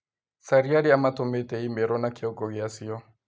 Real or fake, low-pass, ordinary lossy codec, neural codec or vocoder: real; none; none; none